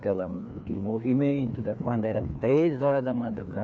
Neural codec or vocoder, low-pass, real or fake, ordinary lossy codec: codec, 16 kHz, 2 kbps, FreqCodec, larger model; none; fake; none